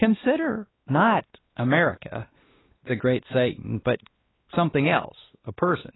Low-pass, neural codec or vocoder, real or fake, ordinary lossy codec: 7.2 kHz; codec, 16 kHz, 2 kbps, X-Codec, WavLM features, trained on Multilingual LibriSpeech; fake; AAC, 16 kbps